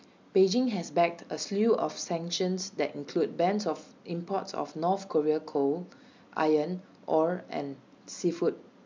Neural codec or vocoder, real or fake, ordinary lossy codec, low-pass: none; real; MP3, 64 kbps; 7.2 kHz